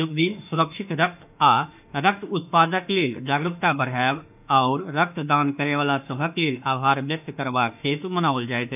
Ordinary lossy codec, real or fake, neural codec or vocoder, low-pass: none; fake; autoencoder, 48 kHz, 32 numbers a frame, DAC-VAE, trained on Japanese speech; 3.6 kHz